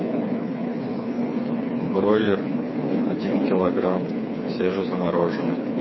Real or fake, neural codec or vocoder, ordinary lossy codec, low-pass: fake; codec, 16 kHz, 4 kbps, FreqCodec, smaller model; MP3, 24 kbps; 7.2 kHz